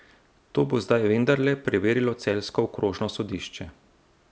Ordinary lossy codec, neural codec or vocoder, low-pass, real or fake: none; none; none; real